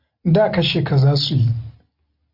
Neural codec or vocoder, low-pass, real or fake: none; 5.4 kHz; real